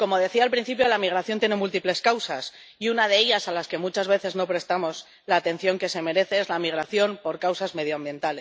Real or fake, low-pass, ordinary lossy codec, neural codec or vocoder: real; none; none; none